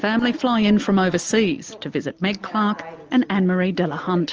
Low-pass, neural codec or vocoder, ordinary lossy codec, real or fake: 7.2 kHz; none; Opus, 24 kbps; real